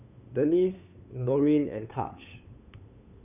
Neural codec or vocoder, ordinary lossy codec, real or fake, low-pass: codec, 16 kHz, 8 kbps, FunCodec, trained on LibriTTS, 25 frames a second; none; fake; 3.6 kHz